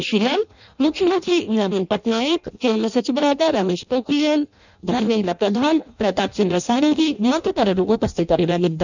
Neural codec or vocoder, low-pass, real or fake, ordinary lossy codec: codec, 16 kHz in and 24 kHz out, 0.6 kbps, FireRedTTS-2 codec; 7.2 kHz; fake; none